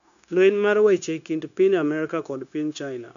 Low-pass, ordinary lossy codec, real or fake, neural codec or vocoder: 7.2 kHz; none; fake; codec, 16 kHz, 0.9 kbps, LongCat-Audio-Codec